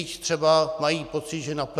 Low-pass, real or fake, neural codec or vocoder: 14.4 kHz; real; none